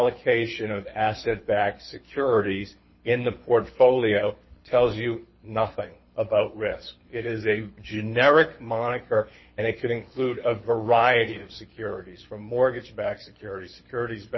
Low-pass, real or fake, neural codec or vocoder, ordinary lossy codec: 7.2 kHz; fake; codec, 24 kHz, 6 kbps, HILCodec; MP3, 24 kbps